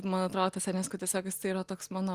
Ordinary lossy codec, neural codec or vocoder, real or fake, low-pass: Opus, 24 kbps; none; real; 14.4 kHz